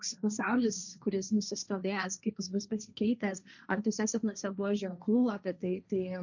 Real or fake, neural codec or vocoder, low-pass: fake; codec, 16 kHz, 1.1 kbps, Voila-Tokenizer; 7.2 kHz